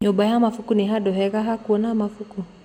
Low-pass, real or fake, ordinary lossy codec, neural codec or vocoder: 19.8 kHz; real; MP3, 96 kbps; none